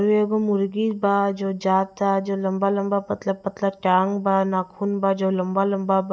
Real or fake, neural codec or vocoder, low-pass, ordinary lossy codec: real; none; none; none